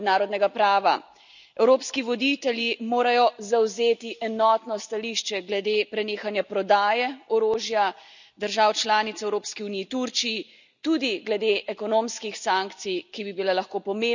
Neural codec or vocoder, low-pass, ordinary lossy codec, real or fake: none; 7.2 kHz; none; real